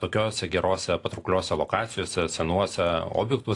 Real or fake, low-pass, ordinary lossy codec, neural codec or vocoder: real; 10.8 kHz; AAC, 48 kbps; none